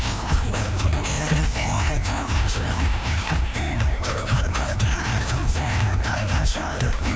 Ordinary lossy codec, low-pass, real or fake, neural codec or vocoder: none; none; fake; codec, 16 kHz, 1 kbps, FreqCodec, larger model